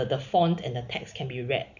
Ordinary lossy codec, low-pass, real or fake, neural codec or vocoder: none; 7.2 kHz; real; none